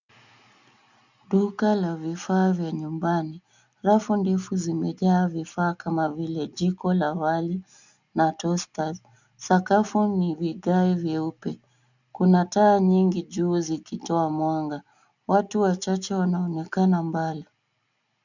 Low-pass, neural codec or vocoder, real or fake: 7.2 kHz; none; real